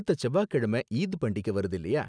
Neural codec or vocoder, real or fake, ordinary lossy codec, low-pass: none; real; none; 9.9 kHz